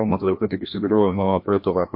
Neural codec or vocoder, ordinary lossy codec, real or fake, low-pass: codec, 16 kHz, 1 kbps, FreqCodec, larger model; MP3, 32 kbps; fake; 5.4 kHz